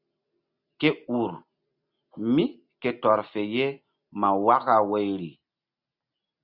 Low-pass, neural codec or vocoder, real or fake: 5.4 kHz; none; real